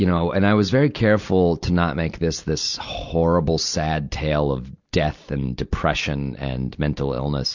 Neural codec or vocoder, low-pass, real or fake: none; 7.2 kHz; real